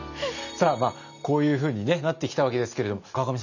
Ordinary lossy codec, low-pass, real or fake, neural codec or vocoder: none; 7.2 kHz; real; none